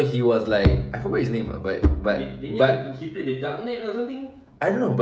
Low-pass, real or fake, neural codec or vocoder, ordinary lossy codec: none; fake; codec, 16 kHz, 16 kbps, FreqCodec, smaller model; none